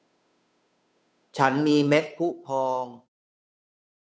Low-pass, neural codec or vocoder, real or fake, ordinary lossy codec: none; codec, 16 kHz, 2 kbps, FunCodec, trained on Chinese and English, 25 frames a second; fake; none